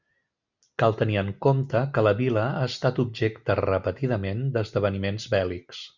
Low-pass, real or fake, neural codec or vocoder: 7.2 kHz; real; none